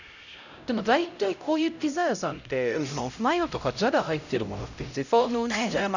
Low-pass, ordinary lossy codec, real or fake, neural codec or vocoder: 7.2 kHz; none; fake; codec, 16 kHz, 0.5 kbps, X-Codec, HuBERT features, trained on LibriSpeech